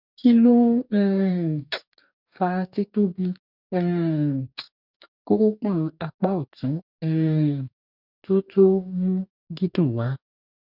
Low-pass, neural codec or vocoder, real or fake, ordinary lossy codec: 5.4 kHz; codec, 44.1 kHz, 2.6 kbps, DAC; fake; none